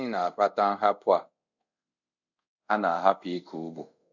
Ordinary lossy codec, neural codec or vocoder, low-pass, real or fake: none; codec, 24 kHz, 0.5 kbps, DualCodec; 7.2 kHz; fake